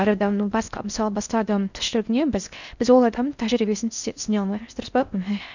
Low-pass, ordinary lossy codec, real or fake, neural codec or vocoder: 7.2 kHz; none; fake; codec, 16 kHz in and 24 kHz out, 0.6 kbps, FocalCodec, streaming, 4096 codes